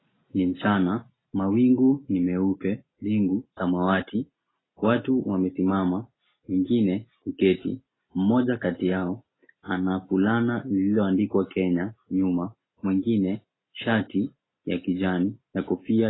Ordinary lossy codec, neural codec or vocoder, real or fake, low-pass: AAC, 16 kbps; none; real; 7.2 kHz